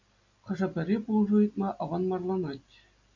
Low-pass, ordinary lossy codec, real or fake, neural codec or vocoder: 7.2 kHz; MP3, 64 kbps; real; none